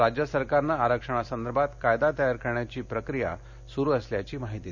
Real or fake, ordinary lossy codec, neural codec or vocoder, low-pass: real; none; none; 7.2 kHz